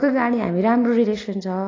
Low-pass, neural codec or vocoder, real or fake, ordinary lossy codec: 7.2 kHz; none; real; AAC, 32 kbps